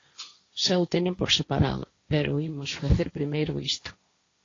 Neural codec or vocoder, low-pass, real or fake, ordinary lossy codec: codec, 16 kHz, 1.1 kbps, Voila-Tokenizer; 7.2 kHz; fake; AAC, 32 kbps